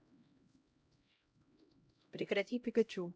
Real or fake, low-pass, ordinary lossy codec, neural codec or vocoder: fake; none; none; codec, 16 kHz, 0.5 kbps, X-Codec, HuBERT features, trained on LibriSpeech